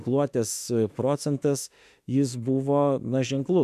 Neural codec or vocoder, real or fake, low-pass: autoencoder, 48 kHz, 32 numbers a frame, DAC-VAE, trained on Japanese speech; fake; 14.4 kHz